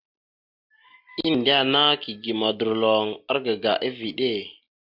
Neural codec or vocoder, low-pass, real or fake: none; 5.4 kHz; real